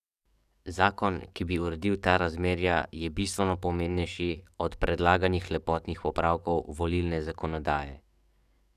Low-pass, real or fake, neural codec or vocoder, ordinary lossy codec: 14.4 kHz; fake; codec, 44.1 kHz, 7.8 kbps, DAC; none